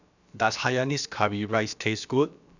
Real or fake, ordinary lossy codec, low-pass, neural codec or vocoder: fake; none; 7.2 kHz; codec, 16 kHz, about 1 kbps, DyCAST, with the encoder's durations